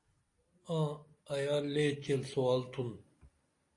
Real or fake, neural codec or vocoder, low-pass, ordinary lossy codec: real; none; 10.8 kHz; AAC, 48 kbps